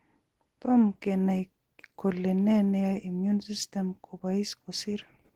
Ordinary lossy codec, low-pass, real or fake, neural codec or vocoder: Opus, 16 kbps; 19.8 kHz; real; none